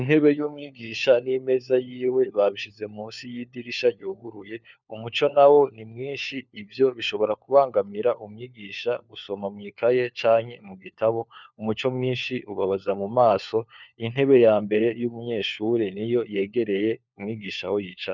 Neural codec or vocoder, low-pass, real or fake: codec, 16 kHz, 4 kbps, FunCodec, trained on LibriTTS, 50 frames a second; 7.2 kHz; fake